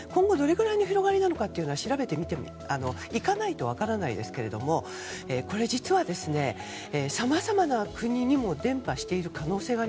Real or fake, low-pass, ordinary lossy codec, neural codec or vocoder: real; none; none; none